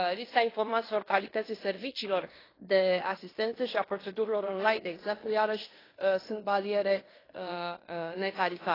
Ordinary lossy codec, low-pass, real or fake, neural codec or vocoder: AAC, 24 kbps; 5.4 kHz; fake; codec, 16 kHz in and 24 kHz out, 0.9 kbps, LongCat-Audio-Codec, fine tuned four codebook decoder